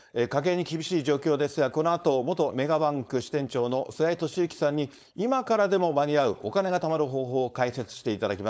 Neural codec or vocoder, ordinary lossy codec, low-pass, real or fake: codec, 16 kHz, 4.8 kbps, FACodec; none; none; fake